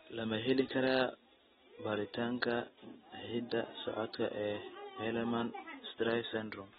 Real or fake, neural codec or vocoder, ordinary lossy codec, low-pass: real; none; AAC, 16 kbps; 19.8 kHz